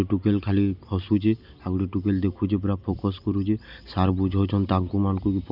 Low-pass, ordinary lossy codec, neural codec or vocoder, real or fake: 5.4 kHz; none; none; real